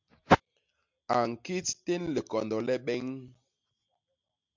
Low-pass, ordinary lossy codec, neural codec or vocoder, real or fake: 7.2 kHz; MP3, 64 kbps; none; real